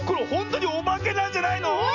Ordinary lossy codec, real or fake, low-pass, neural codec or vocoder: none; real; 7.2 kHz; none